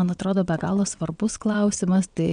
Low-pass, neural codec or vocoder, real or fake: 9.9 kHz; vocoder, 22.05 kHz, 80 mel bands, WaveNeXt; fake